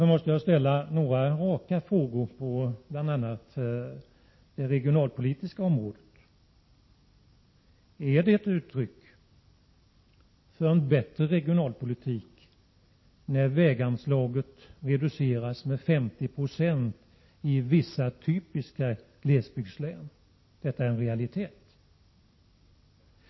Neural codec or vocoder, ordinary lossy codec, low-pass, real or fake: none; MP3, 24 kbps; 7.2 kHz; real